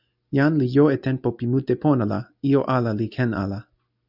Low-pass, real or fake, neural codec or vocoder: 5.4 kHz; real; none